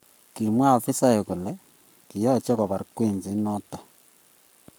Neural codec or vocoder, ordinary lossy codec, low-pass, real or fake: codec, 44.1 kHz, 7.8 kbps, Pupu-Codec; none; none; fake